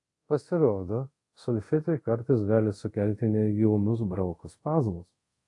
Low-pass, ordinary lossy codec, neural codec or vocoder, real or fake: 10.8 kHz; AAC, 48 kbps; codec, 24 kHz, 0.5 kbps, DualCodec; fake